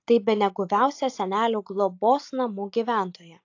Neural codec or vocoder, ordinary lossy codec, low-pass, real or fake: none; AAC, 48 kbps; 7.2 kHz; real